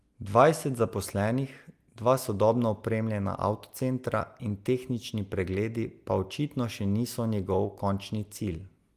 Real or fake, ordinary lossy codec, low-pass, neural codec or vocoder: real; Opus, 32 kbps; 14.4 kHz; none